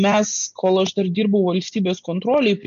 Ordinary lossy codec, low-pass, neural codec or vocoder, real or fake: MP3, 48 kbps; 7.2 kHz; none; real